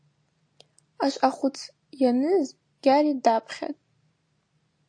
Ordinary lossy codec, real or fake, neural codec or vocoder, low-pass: AAC, 48 kbps; real; none; 9.9 kHz